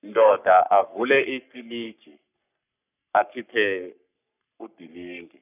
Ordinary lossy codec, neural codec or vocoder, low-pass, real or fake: none; codec, 44.1 kHz, 3.4 kbps, Pupu-Codec; 3.6 kHz; fake